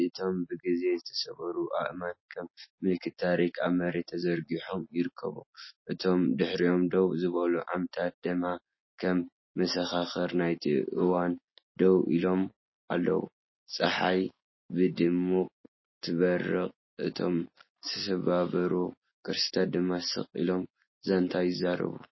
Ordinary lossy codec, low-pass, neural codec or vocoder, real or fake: MP3, 24 kbps; 7.2 kHz; none; real